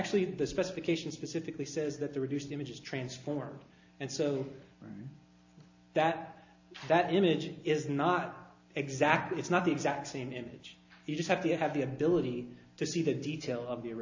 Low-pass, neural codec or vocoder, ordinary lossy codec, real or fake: 7.2 kHz; none; AAC, 48 kbps; real